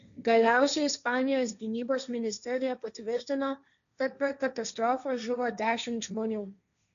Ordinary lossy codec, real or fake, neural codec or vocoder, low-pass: MP3, 96 kbps; fake; codec, 16 kHz, 1.1 kbps, Voila-Tokenizer; 7.2 kHz